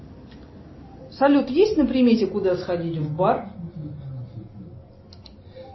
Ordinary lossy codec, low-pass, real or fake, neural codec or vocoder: MP3, 24 kbps; 7.2 kHz; real; none